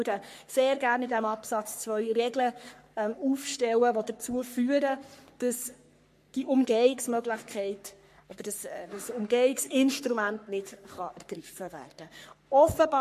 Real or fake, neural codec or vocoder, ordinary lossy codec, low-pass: fake; codec, 44.1 kHz, 3.4 kbps, Pupu-Codec; MP3, 64 kbps; 14.4 kHz